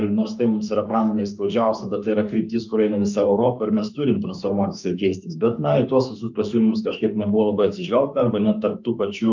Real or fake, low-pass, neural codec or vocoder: fake; 7.2 kHz; autoencoder, 48 kHz, 32 numbers a frame, DAC-VAE, trained on Japanese speech